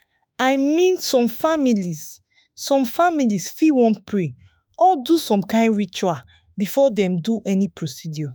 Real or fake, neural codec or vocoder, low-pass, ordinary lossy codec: fake; autoencoder, 48 kHz, 32 numbers a frame, DAC-VAE, trained on Japanese speech; none; none